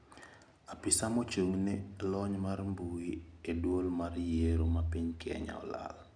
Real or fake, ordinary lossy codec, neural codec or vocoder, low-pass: real; none; none; 9.9 kHz